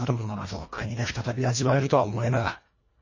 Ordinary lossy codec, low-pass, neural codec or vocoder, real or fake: MP3, 32 kbps; 7.2 kHz; codec, 24 kHz, 1.5 kbps, HILCodec; fake